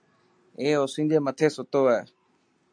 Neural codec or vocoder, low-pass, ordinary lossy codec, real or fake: none; 9.9 kHz; AAC, 64 kbps; real